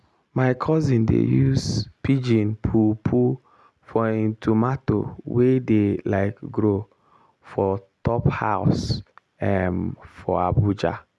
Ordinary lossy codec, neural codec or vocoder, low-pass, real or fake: none; none; none; real